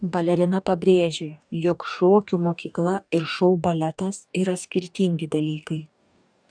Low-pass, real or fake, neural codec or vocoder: 9.9 kHz; fake; codec, 44.1 kHz, 2.6 kbps, DAC